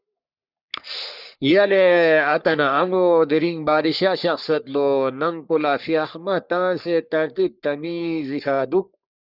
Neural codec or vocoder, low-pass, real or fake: codec, 44.1 kHz, 3.4 kbps, Pupu-Codec; 5.4 kHz; fake